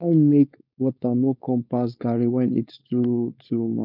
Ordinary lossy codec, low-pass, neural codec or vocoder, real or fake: none; 5.4 kHz; codec, 16 kHz, 4.8 kbps, FACodec; fake